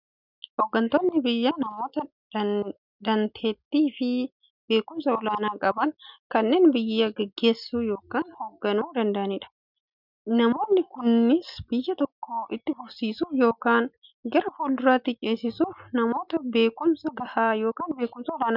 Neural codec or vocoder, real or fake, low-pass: none; real; 5.4 kHz